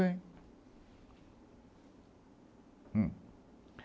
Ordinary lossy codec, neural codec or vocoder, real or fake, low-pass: none; none; real; none